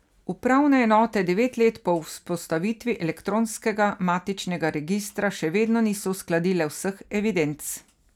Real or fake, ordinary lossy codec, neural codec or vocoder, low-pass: real; none; none; 19.8 kHz